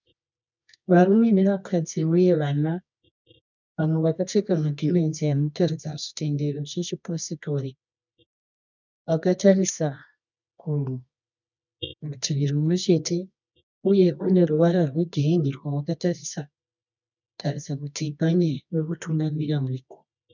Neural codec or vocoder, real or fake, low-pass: codec, 24 kHz, 0.9 kbps, WavTokenizer, medium music audio release; fake; 7.2 kHz